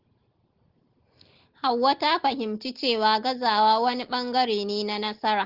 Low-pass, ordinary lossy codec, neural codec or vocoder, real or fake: 5.4 kHz; Opus, 16 kbps; none; real